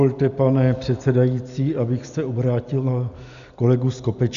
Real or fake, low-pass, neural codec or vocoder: real; 7.2 kHz; none